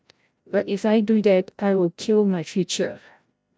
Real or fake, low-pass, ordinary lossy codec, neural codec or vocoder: fake; none; none; codec, 16 kHz, 0.5 kbps, FreqCodec, larger model